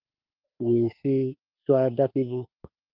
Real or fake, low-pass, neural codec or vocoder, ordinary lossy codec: fake; 5.4 kHz; autoencoder, 48 kHz, 32 numbers a frame, DAC-VAE, trained on Japanese speech; Opus, 32 kbps